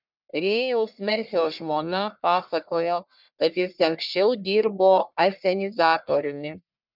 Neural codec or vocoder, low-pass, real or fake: codec, 44.1 kHz, 1.7 kbps, Pupu-Codec; 5.4 kHz; fake